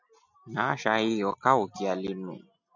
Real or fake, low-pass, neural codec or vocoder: real; 7.2 kHz; none